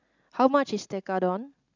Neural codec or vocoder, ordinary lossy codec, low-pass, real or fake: none; none; 7.2 kHz; real